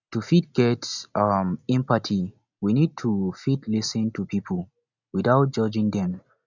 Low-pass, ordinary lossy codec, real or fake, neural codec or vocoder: 7.2 kHz; none; real; none